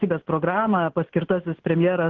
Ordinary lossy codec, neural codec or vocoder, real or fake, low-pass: Opus, 16 kbps; none; real; 7.2 kHz